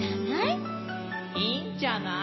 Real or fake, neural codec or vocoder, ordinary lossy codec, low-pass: real; none; MP3, 24 kbps; 7.2 kHz